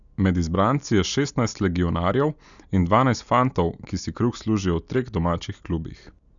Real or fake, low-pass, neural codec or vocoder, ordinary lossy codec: real; 7.2 kHz; none; none